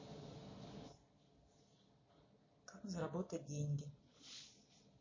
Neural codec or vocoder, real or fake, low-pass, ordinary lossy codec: none; real; 7.2 kHz; MP3, 32 kbps